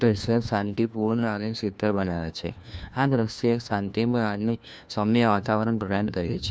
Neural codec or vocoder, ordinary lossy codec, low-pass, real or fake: codec, 16 kHz, 1 kbps, FunCodec, trained on LibriTTS, 50 frames a second; none; none; fake